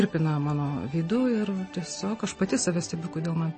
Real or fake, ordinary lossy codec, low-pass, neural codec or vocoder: real; MP3, 32 kbps; 10.8 kHz; none